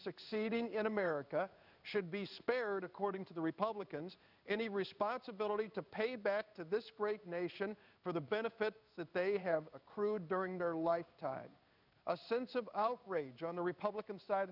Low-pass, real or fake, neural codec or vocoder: 5.4 kHz; fake; codec, 16 kHz in and 24 kHz out, 1 kbps, XY-Tokenizer